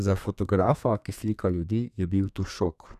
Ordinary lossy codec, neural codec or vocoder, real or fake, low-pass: none; codec, 32 kHz, 1.9 kbps, SNAC; fake; 14.4 kHz